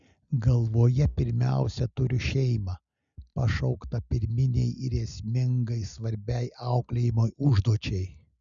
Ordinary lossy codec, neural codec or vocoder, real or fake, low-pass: MP3, 96 kbps; none; real; 7.2 kHz